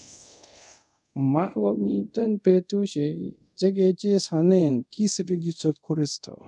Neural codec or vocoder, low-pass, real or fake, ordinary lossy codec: codec, 24 kHz, 0.5 kbps, DualCodec; 10.8 kHz; fake; none